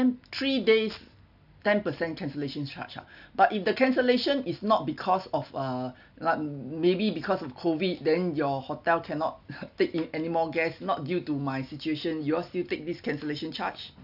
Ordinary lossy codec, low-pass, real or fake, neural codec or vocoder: none; 5.4 kHz; real; none